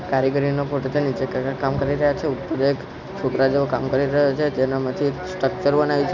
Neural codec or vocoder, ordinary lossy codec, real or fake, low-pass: none; none; real; 7.2 kHz